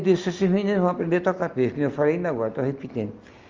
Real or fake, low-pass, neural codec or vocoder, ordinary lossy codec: real; 7.2 kHz; none; Opus, 32 kbps